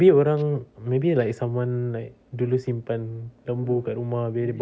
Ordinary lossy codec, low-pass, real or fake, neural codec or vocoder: none; none; real; none